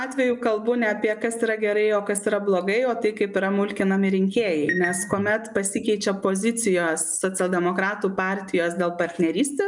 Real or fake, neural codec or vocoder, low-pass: real; none; 10.8 kHz